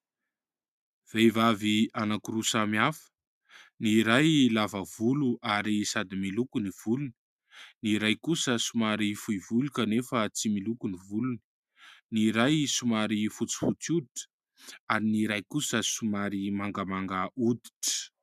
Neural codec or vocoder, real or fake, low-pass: none; real; 14.4 kHz